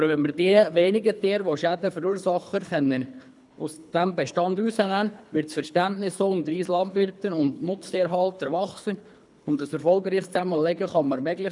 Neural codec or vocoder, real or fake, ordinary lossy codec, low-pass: codec, 24 kHz, 3 kbps, HILCodec; fake; none; 10.8 kHz